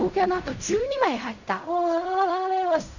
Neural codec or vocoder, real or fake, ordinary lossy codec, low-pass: codec, 16 kHz in and 24 kHz out, 0.4 kbps, LongCat-Audio-Codec, fine tuned four codebook decoder; fake; none; 7.2 kHz